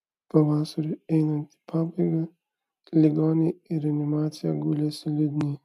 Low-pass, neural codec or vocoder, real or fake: 14.4 kHz; none; real